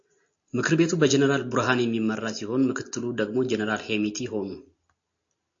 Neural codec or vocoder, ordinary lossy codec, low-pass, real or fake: none; AAC, 48 kbps; 7.2 kHz; real